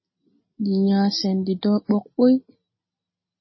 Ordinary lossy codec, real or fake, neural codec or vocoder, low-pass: MP3, 24 kbps; real; none; 7.2 kHz